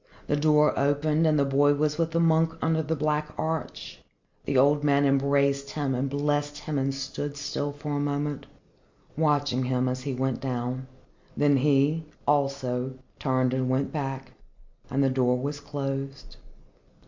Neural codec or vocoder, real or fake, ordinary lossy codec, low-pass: none; real; MP3, 48 kbps; 7.2 kHz